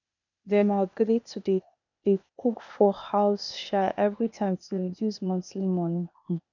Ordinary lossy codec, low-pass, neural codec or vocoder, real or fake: none; 7.2 kHz; codec, 16 kHz, 0.8 kbps, ZipCodec; fake